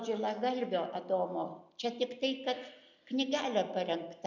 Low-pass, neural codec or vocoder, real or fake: 7.2 kHz; none; real